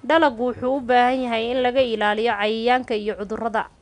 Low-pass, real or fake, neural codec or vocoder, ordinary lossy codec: 10.8 kHz; real; none; none